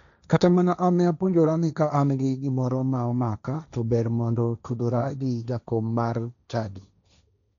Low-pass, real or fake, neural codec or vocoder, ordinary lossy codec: 7.2 kHz; fake; codec, 16 kHz, 1.1 kbps, Voila-Tokenizer; none